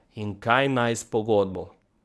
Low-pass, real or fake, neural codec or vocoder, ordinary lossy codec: none; fake; codec, 24 kHz, 0.9 kbps, WavTokenizer, medium speech release version 1; none